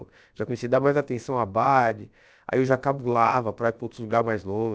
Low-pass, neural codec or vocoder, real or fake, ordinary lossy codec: none; codec, 16 kHz, about 1 kbps, DyCAST, with the encoder's durations; fake; none